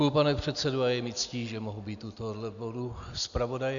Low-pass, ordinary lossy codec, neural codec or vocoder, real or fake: 7.2 kHz; MP3, 96 kbps; none; real